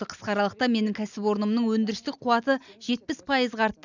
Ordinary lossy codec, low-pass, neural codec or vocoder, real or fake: none; 7.2 kHz; none; real